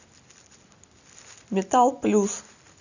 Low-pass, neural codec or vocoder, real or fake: 7.2 kHz; none; real